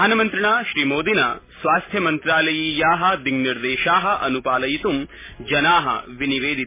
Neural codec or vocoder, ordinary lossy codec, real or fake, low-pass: none; MP3, 16 kbps; real; 3.6 kHz